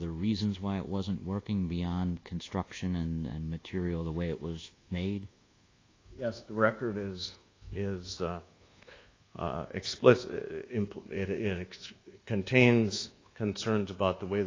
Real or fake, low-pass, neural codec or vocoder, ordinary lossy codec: fake; 7.2 kHz; codec, 24 kHz, 1.2 kbps, DualCodec; AAC, 32 kbps